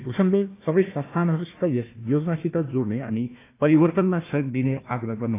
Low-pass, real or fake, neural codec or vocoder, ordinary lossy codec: 3.6 kHz; fake; codec, 16 kHz, 1 kbps, FunCodec, trained on Chinese and English, 50 frames a second; AAC, 24 kbps